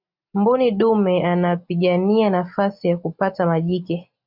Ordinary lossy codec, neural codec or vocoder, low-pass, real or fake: MP3, 48 kbps; none; 5.4 kHz; real